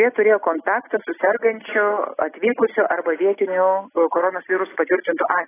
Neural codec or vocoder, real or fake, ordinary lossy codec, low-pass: none; real; AAC, 16 kbps; 3.6 kHz